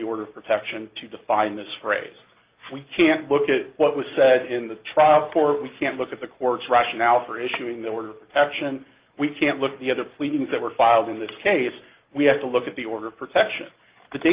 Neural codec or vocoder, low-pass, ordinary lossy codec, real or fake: none; 3.6 kHz; Opus, 32 kbps; real